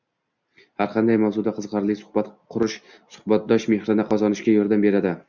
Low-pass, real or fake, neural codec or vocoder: 7.2 kHz; real; none